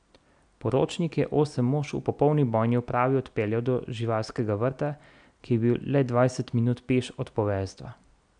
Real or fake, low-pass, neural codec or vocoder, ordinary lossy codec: real; 9.9 kHz; none; none